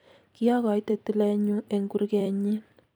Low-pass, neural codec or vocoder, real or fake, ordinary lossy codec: none; vocoder, 44.1 kHz, 128 mel bands every 256 samples, BigVGAN v2; fake; none